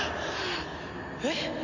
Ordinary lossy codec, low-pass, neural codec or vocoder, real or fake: none; 7.2 kHz; none; real